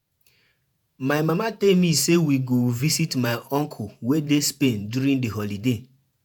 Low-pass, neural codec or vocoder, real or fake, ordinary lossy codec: none; vocoder, 48 kHz, 128 mel bands, Vocos; fake; none